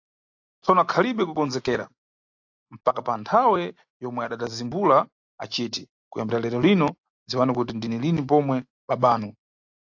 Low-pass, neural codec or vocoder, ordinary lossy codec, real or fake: 7.2 kHz; none; AAC, 48 kbps; real